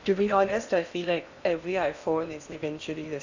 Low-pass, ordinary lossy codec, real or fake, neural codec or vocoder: 7.2 kHz; none; fake; codec, 16 kHz in and 24 kHz out, 0.8 kbps, FocalCodec, streaming, 65536 codes